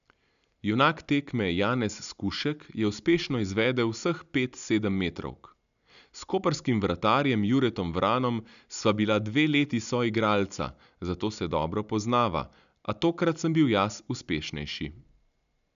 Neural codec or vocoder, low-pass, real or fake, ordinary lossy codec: none; 7.2 kHz; real; none